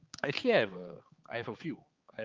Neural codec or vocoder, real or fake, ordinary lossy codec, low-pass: codec, 16 kHz, 4 kbps, X-Codec, HuBERT features, trained on balanced general audio; fake; Opus, 32 kbps; 7.2 kHz